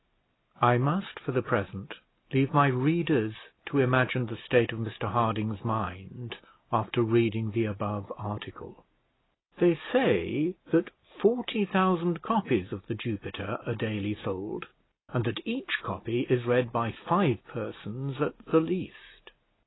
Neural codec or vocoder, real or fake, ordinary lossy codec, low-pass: none; real; AAC, 16 kbps; 7.2 kHz